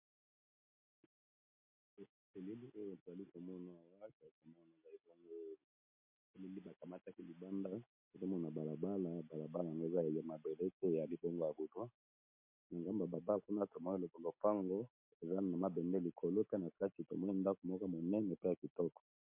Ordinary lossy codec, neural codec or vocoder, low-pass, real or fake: MP3, 32 kbps; none; 3.6 kHz; real